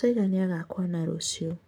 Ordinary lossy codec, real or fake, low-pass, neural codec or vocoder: none; fake; none; codec, 44.1 kHz, 7.8 kbps, Pupu-Codec